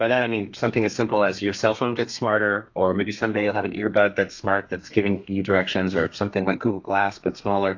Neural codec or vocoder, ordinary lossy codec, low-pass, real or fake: codec, 32 kHz, 1.9 kbps, SNAC; AAC, 48 kbps; 7.2 kHz; fake